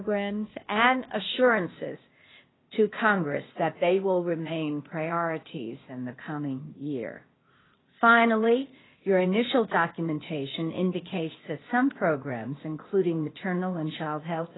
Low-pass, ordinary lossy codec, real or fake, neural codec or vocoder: 7.2 kHz; AAC, 16 kbps; fake; codec, 16 kHz, 0.8 kbps, ZipCodec